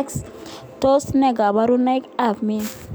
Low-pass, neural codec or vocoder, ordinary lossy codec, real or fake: none; none; none; real